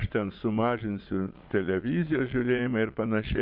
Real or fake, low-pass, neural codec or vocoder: fake; 5.4 kHz; vocoder, 22.05 kHz, 80 mel bands, Vocos